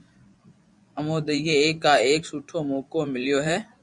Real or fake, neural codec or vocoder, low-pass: fake; vocoder, 24 kHz, 100 mel bands, Vocos; 10.8 kHz